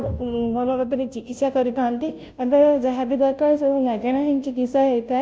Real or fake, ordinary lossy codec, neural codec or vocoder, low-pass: fake; none; codec, 16 kHz, 0.5 kbps, FunCodec, trained on Chinese and English, 25 frames a second; none